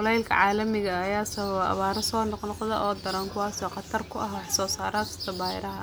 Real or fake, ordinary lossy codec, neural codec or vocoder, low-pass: real; none; none; none